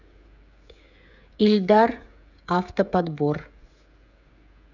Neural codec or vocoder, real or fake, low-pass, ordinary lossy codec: codec, 16 kHz, 16 kbps, FreqCodec, smaller model; fake; 7.2 kHz; none